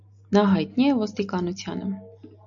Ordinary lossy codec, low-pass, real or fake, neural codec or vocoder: AAC, 64 kbps; 7.2 kHz; real; none